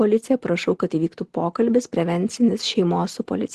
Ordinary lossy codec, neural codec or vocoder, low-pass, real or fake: Opus, 16 kbps; none; 10.8 kHz; real